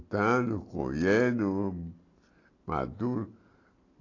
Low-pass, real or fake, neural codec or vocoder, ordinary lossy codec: 7.2 kHz; real; none; AAC, 32 kbps